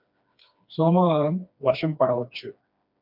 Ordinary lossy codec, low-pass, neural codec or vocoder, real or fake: MP3, 48 kbps; 5.4 kHz; codec, 16 kHz, 2 kbps, FreqCodec, smaller model; fake